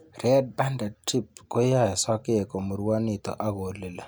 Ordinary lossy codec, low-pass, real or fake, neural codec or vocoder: none; none; real; none